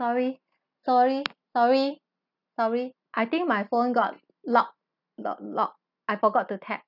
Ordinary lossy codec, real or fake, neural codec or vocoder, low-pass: none; real; none; 5.4 kHz